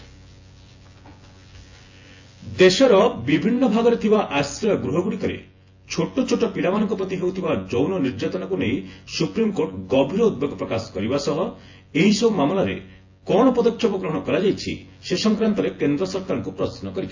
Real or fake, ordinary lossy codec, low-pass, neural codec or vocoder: fake; AAC, 48 kbps; 7.2 kHz; vocoder, 24 kHz, 100 mel bands, Vocos